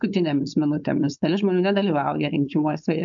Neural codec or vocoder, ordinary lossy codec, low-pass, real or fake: codec, 16 kHz, 4.8 kbps, FACodec; MP3, 96 kbps; 7.2 kHz; fake